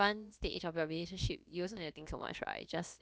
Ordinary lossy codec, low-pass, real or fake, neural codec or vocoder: none; none; fake; codec, 16 kHz, about 1 kbps, DyCAST, with the encoder's durations